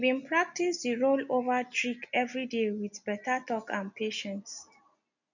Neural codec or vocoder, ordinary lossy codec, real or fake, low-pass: none; none; real; 7.2 kHz